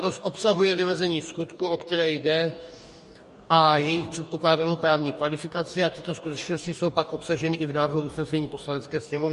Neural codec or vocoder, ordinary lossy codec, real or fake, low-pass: codec, 44.1 kHz, 2.6 kbps, DAC; MP3, 48 kbps; fake; 14.4 kHz